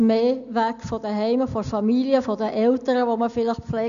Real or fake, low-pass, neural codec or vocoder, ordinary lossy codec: real; 7.2 kHz; none; none